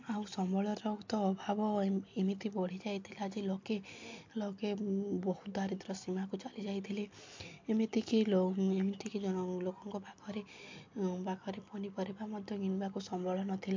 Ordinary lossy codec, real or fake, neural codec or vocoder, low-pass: MP3, 48 kbps; real; none; 7.2 kHz